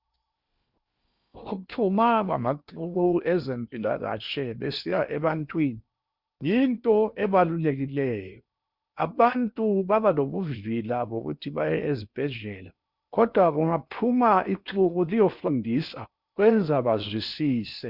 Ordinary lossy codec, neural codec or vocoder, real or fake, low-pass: Opus, 64 kbps; codec, 16 kHz in and 24 kHz out, 0.8 kbps, FocalCodec, streaming, 65536 codes; fake; 5.4 kHz